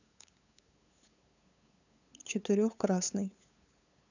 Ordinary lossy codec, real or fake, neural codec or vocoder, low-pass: none; fake; codec, 16 kHz, 8 kbps, FunCodec, trained on LibriTTS, 25 frames a second; 7.2 kHz